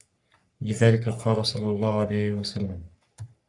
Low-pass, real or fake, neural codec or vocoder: 10.8 kHz; fake; codec, 44.1 kHz, 3.4 kbps, Pupu-Codec